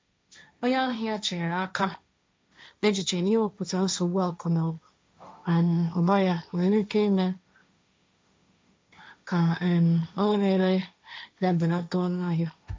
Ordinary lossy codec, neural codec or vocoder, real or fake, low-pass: none; codec, 16 kHz, 1.1 kbps, Voila-Tokenizer; fake; none